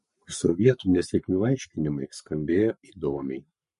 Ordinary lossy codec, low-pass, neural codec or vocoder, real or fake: MP3, 48 kbps; 14.4 kHz; codec, 44.1 kHz, 7.8 kbps, Pupu-Codec; fake